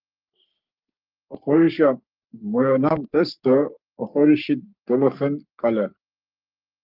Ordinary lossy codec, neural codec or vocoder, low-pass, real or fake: Opus, 32 kbps; codec, 44.1 kHz, 3.4 kbps, Pupu-Codec; 5.4 kHz; fake